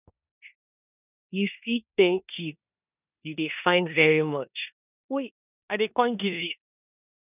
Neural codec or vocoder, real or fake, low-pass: codec, 16 kHz in and 24 kHz out, 0.9 kbps, LongCat-Audio-Codec, four codebook decoder; fake; 3.6 kHz